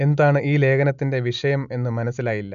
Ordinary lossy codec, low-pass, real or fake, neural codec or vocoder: none; 7.2 kHz; real; none